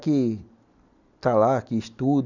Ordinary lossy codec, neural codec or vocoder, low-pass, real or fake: none; none; 7.2 kHz; real